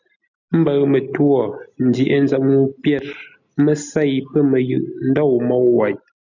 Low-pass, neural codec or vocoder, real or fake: 7.2 kHz; none; real